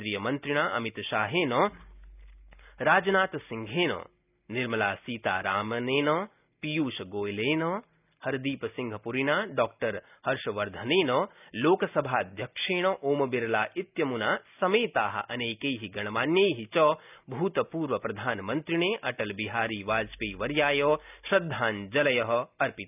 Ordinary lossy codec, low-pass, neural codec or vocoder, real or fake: none; 3.6 kHz; none; real